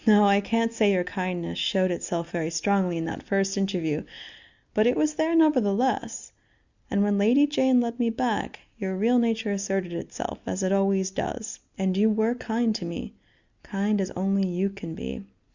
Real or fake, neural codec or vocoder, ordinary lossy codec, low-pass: real; none; Opus, 64 kbps; 7.2 kHz